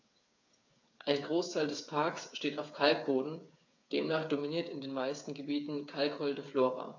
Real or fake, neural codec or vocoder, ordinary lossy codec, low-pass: fake; codec, 16 kHz, 8 kbps, FreqCodec, smaller model; AAC, 48 kbps; 7.2 kHz